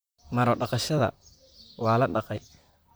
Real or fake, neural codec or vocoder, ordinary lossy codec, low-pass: fake; vocoder, 44.1 kHz, 128 mel bands, Pupu-Vocoder; none; none